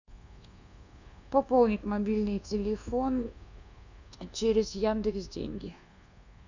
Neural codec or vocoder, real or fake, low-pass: codec, 24 kHz, 1.2 kbps, DualCodec; fake; 7.2 kHz